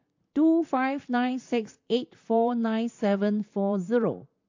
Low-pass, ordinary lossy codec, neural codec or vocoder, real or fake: 7.2 kHz; AAC, 48 kbps; vocoder, 44.1 kHz, 128 mel bands, Pupu-Vocoder; fake